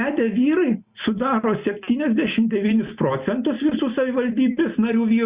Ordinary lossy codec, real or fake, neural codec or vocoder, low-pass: Opus, 64 kbps; real; none; 3.6 kHz